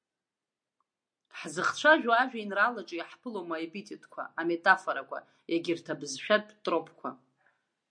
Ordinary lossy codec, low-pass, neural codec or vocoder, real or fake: MP3, 48 kbps; 9.9 kHz; none; real